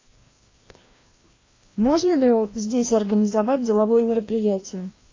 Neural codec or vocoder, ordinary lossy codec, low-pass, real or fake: codec, 16 kHz, 1 kbps, FreqCodec, larger model; AAC, 32 kbps; 7.2 kHz; fake